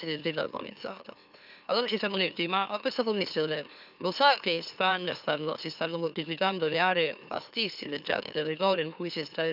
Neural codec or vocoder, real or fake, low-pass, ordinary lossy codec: autoencoder, 44.1 kHz, a latent of 192 numbers a frame, MeloTTS; fake; 5.4 kHz; none